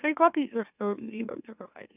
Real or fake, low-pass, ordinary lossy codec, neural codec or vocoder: fake; 3.6 kHz; none; autoencoder, 44.1 kHz, a latent of 192 numbers a frame, MeloTTS